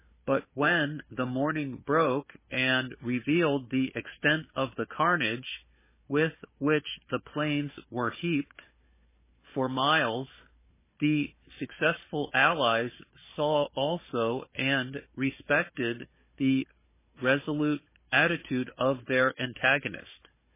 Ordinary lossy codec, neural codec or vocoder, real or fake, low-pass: MP3, 16 kbps; codec, 16 kHz, 4 kbps, FunCodec, trained on Chinese and English, 50 frames a second; fake; 3.6 kHz